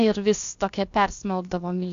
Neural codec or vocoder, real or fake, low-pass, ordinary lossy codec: codec, 16 kHz, about 1 kbps, DyCAST, with the encoder's durations; fake; 7.2 kHz; AAC, 48 kbps